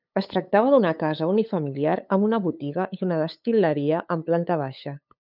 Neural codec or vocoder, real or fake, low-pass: codec, 16 kHz, 8 kbps, FunCodec, trained on LibriTTS, 25 frames a second; fake; 5.4 kHz